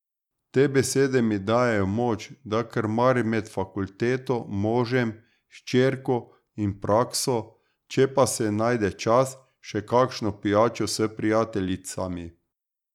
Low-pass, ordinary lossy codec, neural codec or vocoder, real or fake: 19.8 kHz; none; none; real